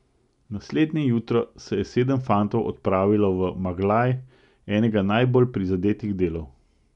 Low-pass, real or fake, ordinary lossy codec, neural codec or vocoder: 10.8 kHz; real; none; none